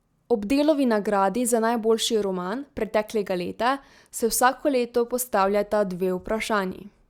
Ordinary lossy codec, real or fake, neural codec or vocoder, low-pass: Opus, 64 kbps; real; none; 19.8 kHz